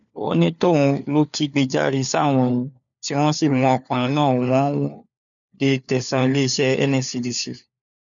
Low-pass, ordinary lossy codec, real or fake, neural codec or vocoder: 7.2 kHz; none; fake; codec, 16 kHz, 4 kbps, FunCodec, trained on LibriTTS, 50 frames a second